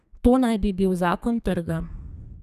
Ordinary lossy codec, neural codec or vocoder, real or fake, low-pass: none; codec, 32 kHz, 1.9 kbps, SNAC; fake; 14.4 kHz